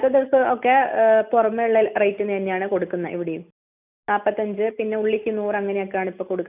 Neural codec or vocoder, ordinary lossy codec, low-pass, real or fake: none; none; 3.6 kHz; real